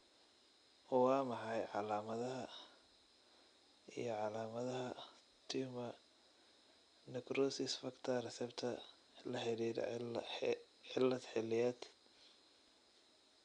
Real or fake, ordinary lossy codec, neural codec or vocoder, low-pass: real; none; none; 9.9 kHz